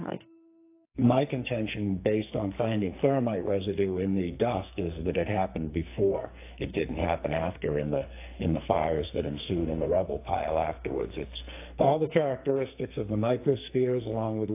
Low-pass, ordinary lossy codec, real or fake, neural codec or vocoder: 3.6 kHz; AAC, 24 kbps; fake; codec, 44.1 kHz, 2.6 kbps, SNAC